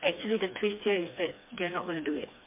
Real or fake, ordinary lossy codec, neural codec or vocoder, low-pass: fake; MP3, 24 kbps; codec, 16 kHz, 2 kbps, FreqCodec, smaller model; 3.6 kHz